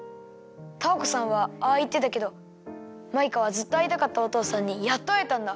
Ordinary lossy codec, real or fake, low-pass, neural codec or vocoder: none; real; none; none